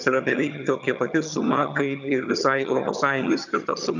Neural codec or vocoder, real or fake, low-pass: vocoder, 22.05 kHz, 80 mel bands, HiFi-GAN; fake; 7.2 kHz